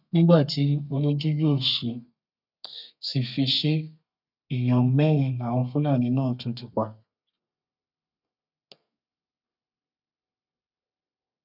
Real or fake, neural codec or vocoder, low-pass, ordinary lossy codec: fake; codec, 32 kHz, 1.9 kbps, SNAC; 5.4 kHz; none